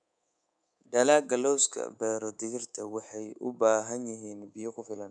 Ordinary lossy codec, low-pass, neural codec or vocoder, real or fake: none; 9.9 kHz; codec, 24 kHz, 3.1 kbps, DualCodec; fake